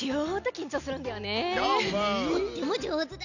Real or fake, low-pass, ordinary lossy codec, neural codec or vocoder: real; 7.2 kHz; none; none